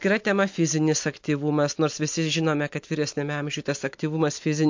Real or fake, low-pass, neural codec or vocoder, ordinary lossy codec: real; 7.2 kHz; none; MP3, 48 kbps